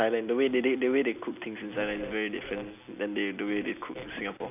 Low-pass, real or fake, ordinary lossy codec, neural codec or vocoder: 3.6 kHz; real; none; none